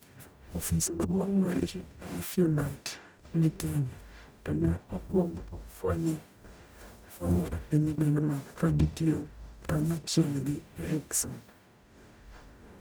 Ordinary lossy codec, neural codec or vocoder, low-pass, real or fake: none; codec, 44.1 kHz, 0.9 kbps, DAC; none; fake